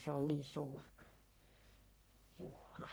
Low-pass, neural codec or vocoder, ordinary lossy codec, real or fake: none; codec, 44.1 kHz, 1.7 kbps, Pupu-Codec; none; fake